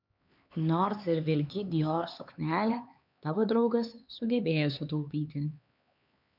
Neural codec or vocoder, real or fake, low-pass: codec, 16 kHz, 4 kbps, X-Codec, HuBERT features, trained on LibriSpeech; fake; 5.4 kHz